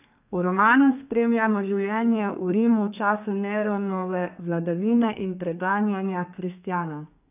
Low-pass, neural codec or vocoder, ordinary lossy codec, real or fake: 3.6 kHz; codec, 32 kHz, 1.9 kbps, SNAC; none; fake